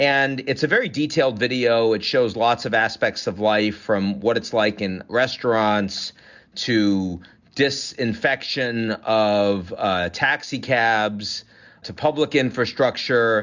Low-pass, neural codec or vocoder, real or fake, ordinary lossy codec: 7.2 kHz; none; real; Opus, 64 kbps